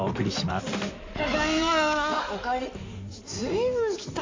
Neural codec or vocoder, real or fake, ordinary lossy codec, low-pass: codec, 16 kHz in and 24 kHz out, 2.2 kbps, FireRedTTS-2 codec; fake; MP3, 48 kbps; 7.2 kHz